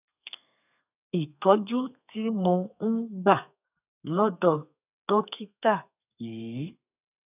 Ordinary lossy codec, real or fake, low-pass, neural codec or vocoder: none; fake; 3.6 kHz; codec, 32 kHz, 1.9 kbps, SNAC